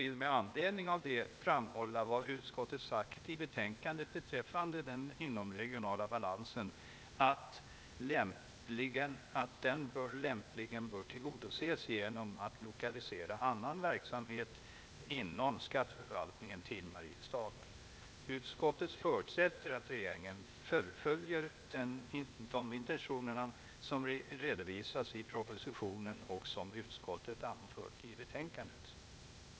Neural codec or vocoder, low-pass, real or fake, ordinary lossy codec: codec, 16 kHz, 0.8 kbps, ZipCodec; none; fake; none